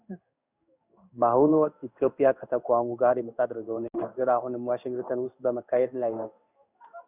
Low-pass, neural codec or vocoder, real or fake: 3.6 kHz; codec, 16 kHz in and 24 kHz out, 1 kbps, XY-Tokenizer; fake